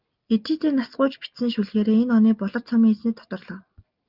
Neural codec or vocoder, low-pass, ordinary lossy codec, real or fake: none; 5.4 kHz; Opus, 24 kbps; real